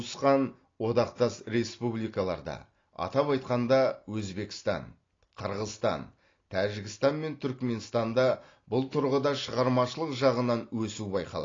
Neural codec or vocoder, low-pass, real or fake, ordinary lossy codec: none; 7.2 kHz; real; AAC, 32 kbps